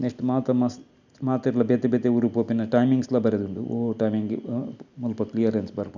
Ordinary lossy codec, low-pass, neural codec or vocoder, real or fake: none; 7.2 kHz; none; real